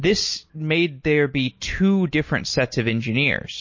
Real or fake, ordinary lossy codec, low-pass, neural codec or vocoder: real; MP3, 32 kbps; 7.2 kHz; none